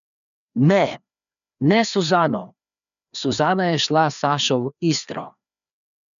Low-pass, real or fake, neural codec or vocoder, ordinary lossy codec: 7.2 kHz; fake; codec, 16 kHz, 2 kbps, FreqCodec, larger model; none